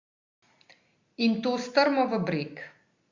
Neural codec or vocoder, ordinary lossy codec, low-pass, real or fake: none; Opus, 64 kbps; 7.2 kHz; real